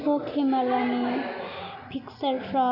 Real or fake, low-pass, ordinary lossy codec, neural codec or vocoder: real; 5.4 kHz; none; none